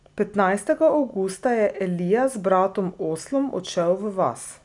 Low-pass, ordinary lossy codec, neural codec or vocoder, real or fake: 10.8 kHz; none; none; real